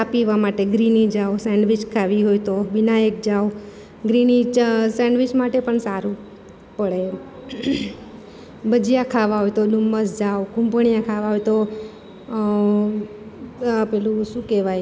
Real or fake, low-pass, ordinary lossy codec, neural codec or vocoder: real; none; none; none